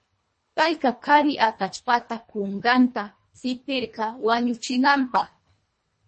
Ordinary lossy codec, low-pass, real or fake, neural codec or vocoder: MP3, 32 kbps; 10.8 kHz; fake; codec, 24 kHz, 1.5 kbps, HILCodec